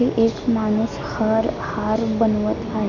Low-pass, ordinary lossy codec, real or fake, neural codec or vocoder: 7.2 kHz; none; real; none